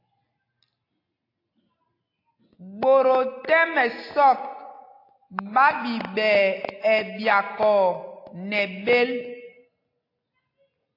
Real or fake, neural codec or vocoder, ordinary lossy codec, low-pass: real; none; AAC, 32 kbps; 5.4 kHz